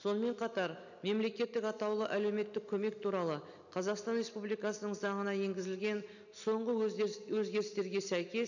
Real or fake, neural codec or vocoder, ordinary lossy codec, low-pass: real; none; none; 7.2 kHz